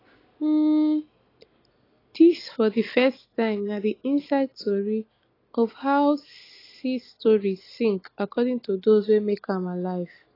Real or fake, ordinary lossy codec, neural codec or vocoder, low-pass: real; AAC, 24 kbps; none; 5.4 kHz